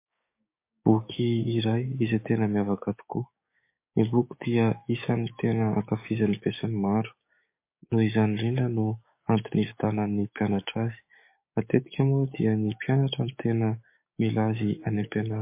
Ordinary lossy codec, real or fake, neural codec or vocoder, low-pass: MP3, 24 kbps; fake; codec, 16 kHz, 6 kbps, DAC; 3.6 kHz